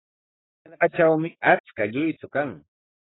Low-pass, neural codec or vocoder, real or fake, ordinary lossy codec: 7.2 kHz; codec, 44.1 kHz, 3.4 kbps, Pupu-Codec; fake; AAC, 16 kbps